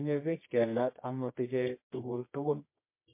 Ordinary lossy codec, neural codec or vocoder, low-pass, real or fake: MP3, 24 kbps; codec, 24 kHz, 0.9 kbps, WavTokenizer, medium music audio release; 3.6 kHz; fake